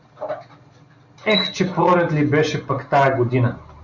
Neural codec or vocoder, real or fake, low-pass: none; real; 7.2 kHz